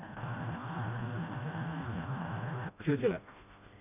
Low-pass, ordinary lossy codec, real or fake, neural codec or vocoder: 3.6 kHz; none; fake; codec, 16 kHz, 1 kbps, FreqCodec, smaller model